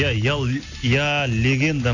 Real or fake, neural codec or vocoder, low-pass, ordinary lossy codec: real; none; 7.2 kHz; none